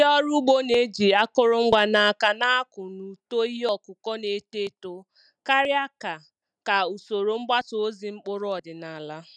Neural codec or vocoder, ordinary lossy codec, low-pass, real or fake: none; none; none; real